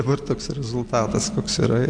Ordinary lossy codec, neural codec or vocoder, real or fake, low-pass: MP3, 64 kbps; none; real; 9.9 kHz